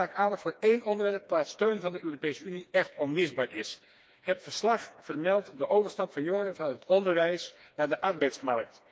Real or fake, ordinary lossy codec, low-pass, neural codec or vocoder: fake; none; none; codec, 16 kHz, 2 kbps, FreqCodec, smaller model